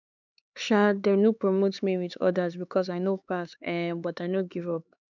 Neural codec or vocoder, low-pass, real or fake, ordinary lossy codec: codec, 16 kHz, 4 kbps, X-Codec, HuBERT features, trained on LibriSpeech; 7.2 kHz; fake; none